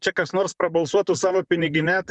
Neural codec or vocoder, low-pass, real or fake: vocoder, 44.1 kHz, 128 mel bands, Pupu-Vocoder; 10.8 kHz; fake